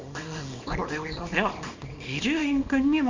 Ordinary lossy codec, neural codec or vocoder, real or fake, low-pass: MP3, 64 kbps; codec, 24 kHz, 0.9 kbps, WavTokenizer, small release; fake; 7.2 kHz